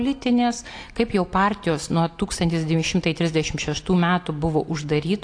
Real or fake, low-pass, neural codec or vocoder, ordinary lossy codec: real; 9.9 kHz; none; AAC, 48 kbps